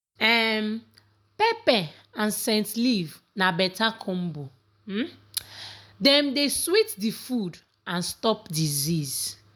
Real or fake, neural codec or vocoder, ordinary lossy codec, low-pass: real; none; none; none